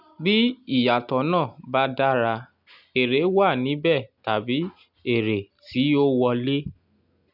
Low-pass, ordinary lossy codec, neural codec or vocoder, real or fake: 5.4 kHz; none; none; real